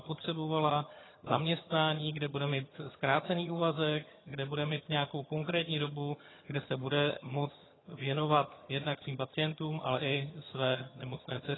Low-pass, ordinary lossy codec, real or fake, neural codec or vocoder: 7.2 kHz; AAC, 16 kbps; fake; vocoder, 22.05 kHz, 80 mel bands, HiFi-GAN